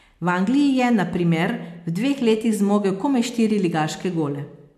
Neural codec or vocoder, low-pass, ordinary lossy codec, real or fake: none; 14.4 kHz; MP3, 96 kbps; real